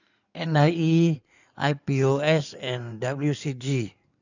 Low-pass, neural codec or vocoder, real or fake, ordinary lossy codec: 7.2 kHz; codec, 16 kHz in and 24 kHz out, 2.2 kbps, FireRedTTS-2 codec; fake; none